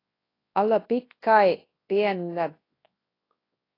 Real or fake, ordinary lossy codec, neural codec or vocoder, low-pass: fake; AAC, 24 kbps; codec, 24 kHz, 0.9 kbps, WavTokenizer, large speech release; 5.4 kHz